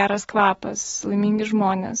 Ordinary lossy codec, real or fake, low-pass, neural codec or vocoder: AAC, 24 kbps; fake; 19.8 kHz; autoencoder, 48 kHz, 128 numbers a frame, DAC-VAE, trained on Japanese speech